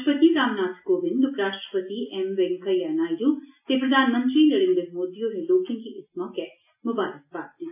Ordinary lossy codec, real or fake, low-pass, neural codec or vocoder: AAC, 32 kbps; real; 3.6 kHz; none